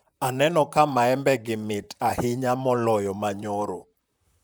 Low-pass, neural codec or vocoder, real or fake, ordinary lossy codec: none; vocoder, 44.1 kHz, 128 mel bands, Pupu-Vocoder; fake; none